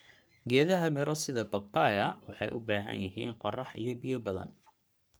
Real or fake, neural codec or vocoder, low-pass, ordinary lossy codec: fake; codec, 44.1 kHz, 3.4 kbps, Pupu-Codec; none; none